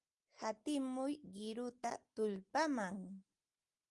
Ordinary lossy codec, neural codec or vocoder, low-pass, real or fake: Opus, 24 kbps; none; 10.8 kHz; real